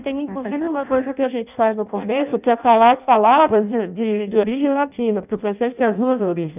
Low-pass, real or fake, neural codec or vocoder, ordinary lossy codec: 3.6 kHz; fake; codec, 16 kHz in and 24 kHz out, 0.6 kbps, FireRedTTS-2 codec; none